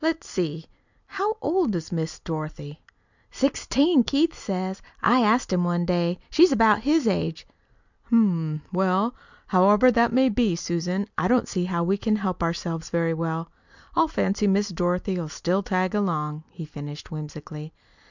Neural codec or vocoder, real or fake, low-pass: none; real; 7.2 kHz